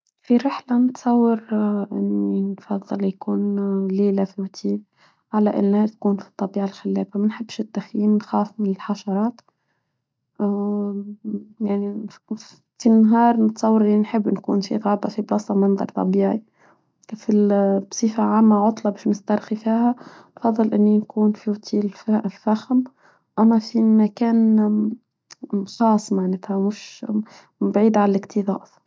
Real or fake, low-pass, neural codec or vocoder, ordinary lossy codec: real; none; none; none